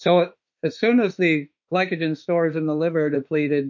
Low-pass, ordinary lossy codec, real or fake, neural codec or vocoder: 7.2 kHz; MP3, 48 kbps; fake; autoencoder, 48 kHz, 32 numbers a frame, DAC-VAE, trained on Japanese speech